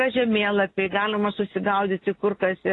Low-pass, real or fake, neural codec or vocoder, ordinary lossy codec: 10.8 kHz; real; none; AAC, 32 kbps